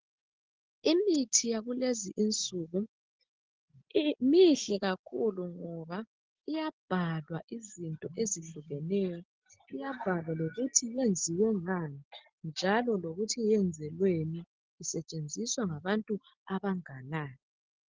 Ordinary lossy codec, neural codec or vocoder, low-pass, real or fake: Opus, 16 kbps; none; 7.2 kHz; real